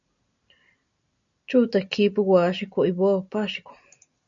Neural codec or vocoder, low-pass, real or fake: none; 7.2 kHz; real